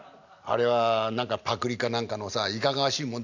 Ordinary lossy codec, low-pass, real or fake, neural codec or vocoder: none; 7.2 kHz; real; none